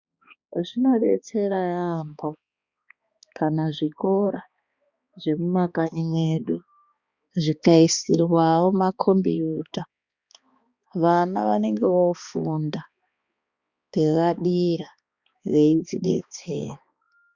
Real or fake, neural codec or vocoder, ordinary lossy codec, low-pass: fake; codec, 16 kHz, 2 kbps, X-Codec, HuBERT features, trained on balanced general audio; Opus, 64 kbps; 7.2 kHz